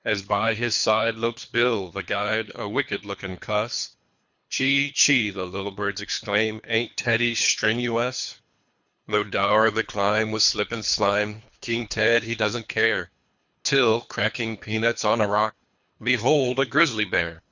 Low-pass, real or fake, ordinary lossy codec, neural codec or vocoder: 7.2 kHz; fake; Opus, 64 kbps; codec, 24 kHz, 3 kbps, HILCodec